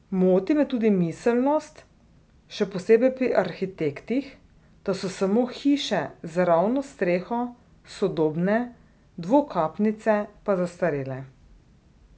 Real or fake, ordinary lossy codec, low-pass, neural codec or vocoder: real; none; none; none